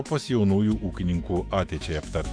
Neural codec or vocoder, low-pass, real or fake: autoencoder, 48 kHz, 128 numbers a frame, DAC-VAE, trained on Japanese speech; 9.9 kHz; fake